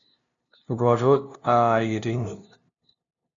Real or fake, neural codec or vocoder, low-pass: fake; codec, 16 kHz, 0.5 kbps, FunCodec, trained on LibriTTS, 25 frames a second; 7.2 kHz